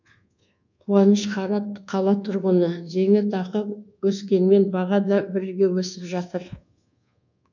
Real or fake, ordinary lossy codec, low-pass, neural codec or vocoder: fake; none; 7.2 kHz; codec, 24 kHz, 1.2 kbps, DualCodec